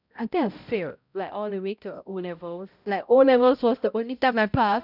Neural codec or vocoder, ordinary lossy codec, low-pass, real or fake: codec, 16 kHz, 0.5 kbps, X-Codec, HuBERT features, trained on balanced general audio; none; 5.4 kHz; fake